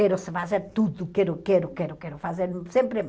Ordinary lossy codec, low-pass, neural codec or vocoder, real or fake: none; none; none; real